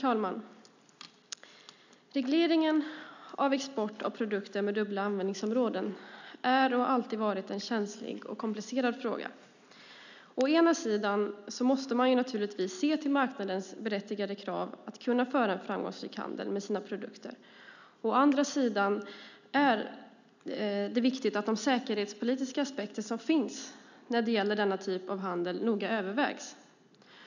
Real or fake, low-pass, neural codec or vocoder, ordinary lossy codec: real; 7.2 kHz; none; none